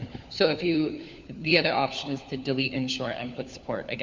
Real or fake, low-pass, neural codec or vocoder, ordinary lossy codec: fake; 7.2 kHz; codec, 16 kHz, 4 kbps, FunCodec, trained on LibriTTS, 50 frames a second; MP3, 64 kbps